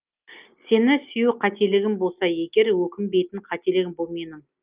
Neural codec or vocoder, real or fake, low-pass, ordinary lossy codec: none; real; 3.6 kHz; Opus, 24 kbps